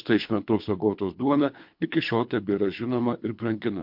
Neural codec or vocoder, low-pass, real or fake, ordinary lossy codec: codec, 24 kHz, 3 kbps, HILCodec; 5.4 kHz; fake; MP3, 48 kbps